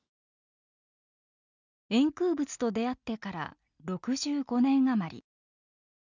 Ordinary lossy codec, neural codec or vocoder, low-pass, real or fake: none; none; 7.2 kHz; real